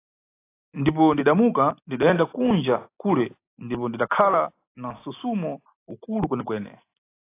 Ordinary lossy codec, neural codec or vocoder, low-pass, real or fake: AAC, 24 kbps; none; 3.6 kHz; real